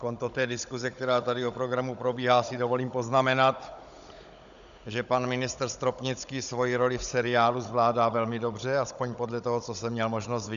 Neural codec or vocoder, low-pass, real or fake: codec, 16 kHz, 16 kbps, FunCodec, trained on Chinese and English, 50 frames a second; 7.2 kHz; fake